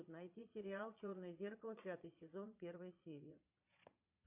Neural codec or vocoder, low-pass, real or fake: vocoder, 22.05 kHz, 80 mel bands, Vocos; 3.6 kHz; fake